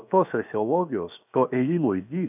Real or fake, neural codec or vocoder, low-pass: fake; codec, 16 kHz, 0.7 kbps, FocalCodec; 3.6 kHz